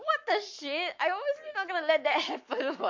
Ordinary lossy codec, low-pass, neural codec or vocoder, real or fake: MP3, 48 kbps; 7.2 kHz; codec, 44.1 kHz, 7.8 kbps, Pupu-Codec; fake